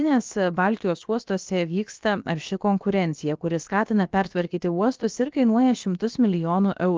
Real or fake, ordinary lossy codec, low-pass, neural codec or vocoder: fake; Opus, 24 kbps; 7.2 kHz; codec, 16 kHz, about 1 kbps, DyCAST, with the encoder's durations